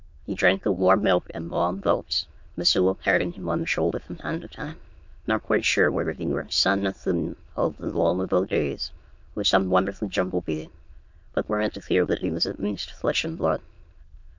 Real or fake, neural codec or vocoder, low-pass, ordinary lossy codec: fake; autoencoder, 22.05 kHz, a latent of 192 numbers a frame, VITS, trained on many speakers; 7.2 kHz; MP3, 48 kbps